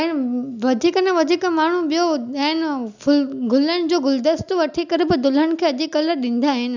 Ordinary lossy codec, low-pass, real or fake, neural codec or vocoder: none; 7.2 kHz; real; none